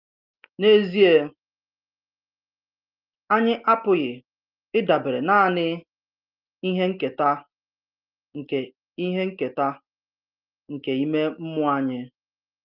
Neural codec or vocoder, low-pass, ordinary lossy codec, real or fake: none; 5.4 kHz; Opus, 32 kbps; real